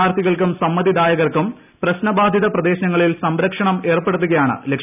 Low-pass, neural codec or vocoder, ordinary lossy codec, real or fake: 3.6 kHz; none; none; real